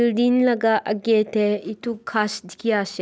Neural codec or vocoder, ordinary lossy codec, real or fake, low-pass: none; none; real; none